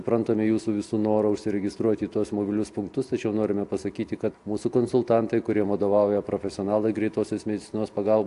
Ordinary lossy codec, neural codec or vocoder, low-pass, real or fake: AAC, 64 kbps; none; 10.8 kHz; real